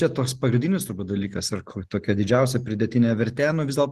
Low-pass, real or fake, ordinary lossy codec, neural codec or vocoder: 14.4 kHz; real; Opus, 16 kbps; none